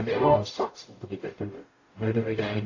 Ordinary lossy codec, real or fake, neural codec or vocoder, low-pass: AAC, 32 kbps; fake; codec, 44.1 kHz, 0.9 kbps, DAC; 7.2 kHz